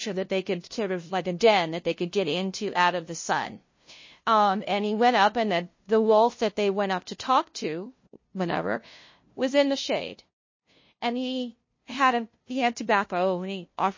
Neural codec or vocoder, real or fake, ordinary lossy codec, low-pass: codec, 16 kHz, 0.5 kbps, FunCodec, trained on LibriTTS, 25 frames a second; fake; MP3, 32 kbps; 7.2 kHz